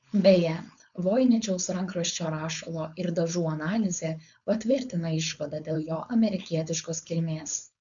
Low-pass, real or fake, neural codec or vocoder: 7.2 kHz; fake; codec, 16 kHz, 4.8 kbps, FACodec